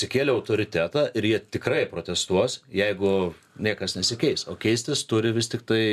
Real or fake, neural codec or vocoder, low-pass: real; none; 14.4 kHz